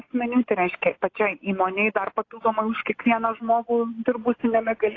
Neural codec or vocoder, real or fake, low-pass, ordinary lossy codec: none; real; 7.2 kHz; AAC, 32 kbps